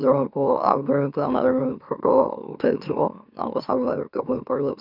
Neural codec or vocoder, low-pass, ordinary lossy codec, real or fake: autoencoder, 44.1 kHz, a latent of 192 numbers a frame, MeloTTS; 5.4 kHz; none; fake